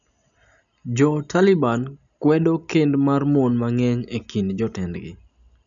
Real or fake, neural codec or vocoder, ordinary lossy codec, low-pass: real; none; none; 7.2 kHz